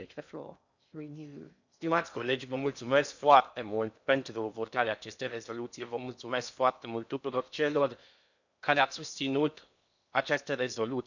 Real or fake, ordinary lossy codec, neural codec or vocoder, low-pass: fake; none; codec, 16 kHz in and 24 kHz out, 0.8 kbps, FocalCodec, streaming, 65536 codes; 7.2 kHz